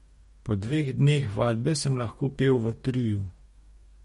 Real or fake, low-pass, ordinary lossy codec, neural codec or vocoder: fake; 19.8 kHz; MP3, 48 kbps; codec, 44.1 kHz, 2.6 kbps, DAC